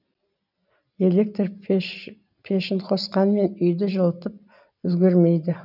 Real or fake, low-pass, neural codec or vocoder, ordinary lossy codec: real; 5.4 kHz; none; none